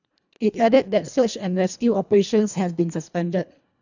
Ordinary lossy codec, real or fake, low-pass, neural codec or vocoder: none; fake; 7.2 kHz; codec, 24 kHz, 1.5 kbps, HILCodec